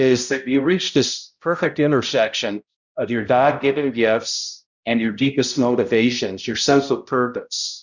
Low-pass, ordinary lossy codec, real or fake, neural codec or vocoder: 7.2 kHz; Opus, 64 kbps; fake; codec, 16 kHz, 0.5 kbps, X-Codec, HuBERT features, trained on balanced general audio